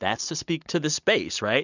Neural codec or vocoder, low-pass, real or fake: none; 7.2 kHz; real